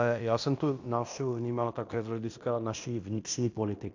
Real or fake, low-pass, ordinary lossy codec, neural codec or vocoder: fake; 7.2 kHz; AAC, 48 kbps; codec, 16 kHz in and 24 kHz out, 0.9 kbps, LongCat-Audio-Codec, fine tuned four codebook decoder